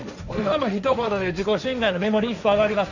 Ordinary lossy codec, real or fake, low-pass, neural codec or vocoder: none; fake; 7.2 kHz; codec, 16 kHz, 1.1 kbps, Voila-Tokenizer